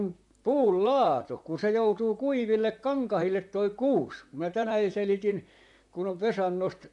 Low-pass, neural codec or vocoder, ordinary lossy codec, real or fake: 10.8 kHz; vocoder, 44.1 kHz, 128 mel bands, Pupu-Vocoder; AAC, 64 kbps; fake